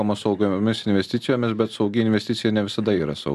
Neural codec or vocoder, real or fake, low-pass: none; real; 14.4 kHz